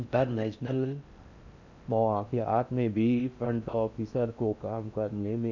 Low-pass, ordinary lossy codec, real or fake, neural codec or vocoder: 7.2 kHz; none; fake; codec, 16 kHz in and 24 kHz out, 0.6 kbps, FocalCodec, streaming, 4096 codes